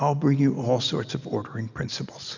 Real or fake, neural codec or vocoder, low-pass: real; none; 7.2 kHz